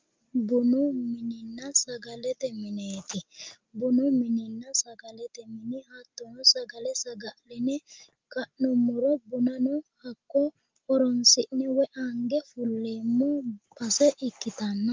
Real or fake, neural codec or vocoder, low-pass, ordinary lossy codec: real; none; 7.2 kHz; Opus, 24 kbps